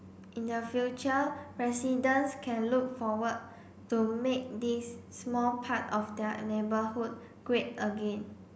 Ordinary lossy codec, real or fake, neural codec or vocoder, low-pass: none; real; none; none